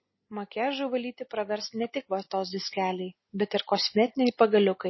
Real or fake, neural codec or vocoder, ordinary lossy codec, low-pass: real; none; MP3, 24 kbps; 7.2 kHz